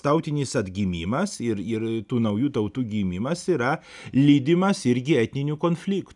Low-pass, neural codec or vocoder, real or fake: 10.8 kHz; none; real